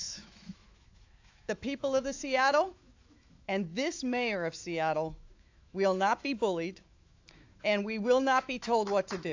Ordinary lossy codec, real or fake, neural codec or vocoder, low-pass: AAC, 48 kbps; fake; autoencoder, 48 kHz, 128 numbers a frame, DAC-VAE, trained on Japanese speech; 7.2 kHz